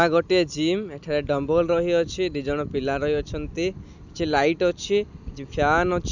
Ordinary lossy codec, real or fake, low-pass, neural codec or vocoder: none; real; 7.2 kHz; none